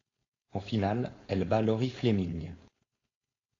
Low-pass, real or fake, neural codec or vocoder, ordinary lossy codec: 7.2 kHz; fake; codec, 16 kHz, 4.8 kbps, FACodec; AAC, 32 kbps